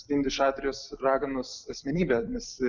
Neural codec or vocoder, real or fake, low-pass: none; real; 7.2 kHz